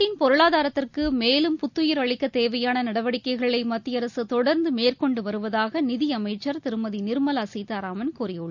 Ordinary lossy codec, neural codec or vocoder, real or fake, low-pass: none; none; real; 7.2 kHz